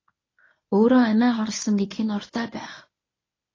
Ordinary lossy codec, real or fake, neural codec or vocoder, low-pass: AAC, 32 kbps; fake; codec, 24 kHz, 0.9 kbps, WavTokenizer, medium speech release version 1; 7.2 kHz